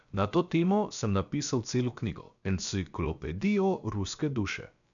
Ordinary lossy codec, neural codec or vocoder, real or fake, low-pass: none; codec, 16 kHz, about 1 kbps, DyCAST, with the encoder's durations; fake; 7.2 kHz